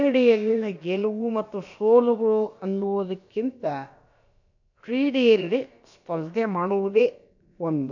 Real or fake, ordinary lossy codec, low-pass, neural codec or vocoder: fake; none; 7.2 kHz; codec, 16 kHz, about 1 kbps, DyCAST, with the encoder's durations